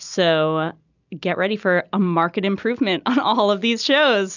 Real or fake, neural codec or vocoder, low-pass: real; none; 7.2 kHz